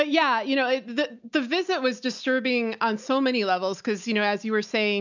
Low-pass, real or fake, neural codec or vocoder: 7.2 kHz; real; none